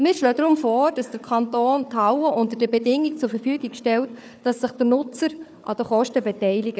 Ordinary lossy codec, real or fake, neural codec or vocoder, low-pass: none; fake; codec, 16 kHz, 4 kbps, FunCodec, trained on Chinese and English, 50 frames a second; none